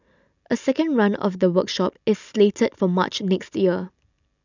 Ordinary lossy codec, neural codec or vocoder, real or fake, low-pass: none; none; real; 7.2 kHz